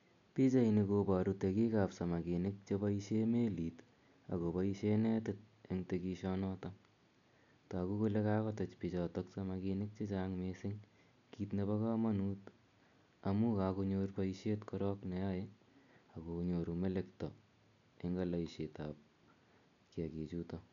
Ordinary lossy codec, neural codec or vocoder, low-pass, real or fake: none; none; 7.2 kHz; real